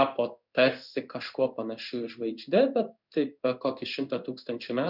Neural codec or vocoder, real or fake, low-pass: codec, 16 kHz in and 24 kHz out, 1 kbps, XY-Tokenizer; fake; 5.4 kHz